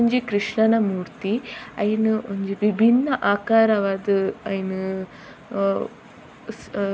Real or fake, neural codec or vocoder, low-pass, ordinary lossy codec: real; none; none; none